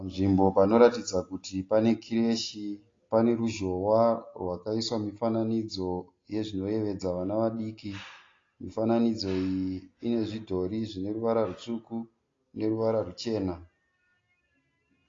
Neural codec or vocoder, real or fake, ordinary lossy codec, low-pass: none; real; AAC, 32 kbps; 7.2 kHz